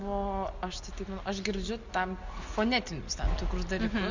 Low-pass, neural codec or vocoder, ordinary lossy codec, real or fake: 7.2 kHz; none; Opus, 64 kbps; real